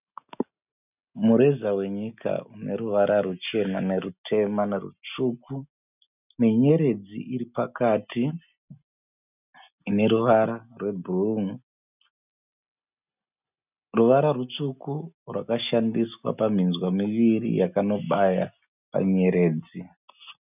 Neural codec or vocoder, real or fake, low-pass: none; real; 3.6 kHz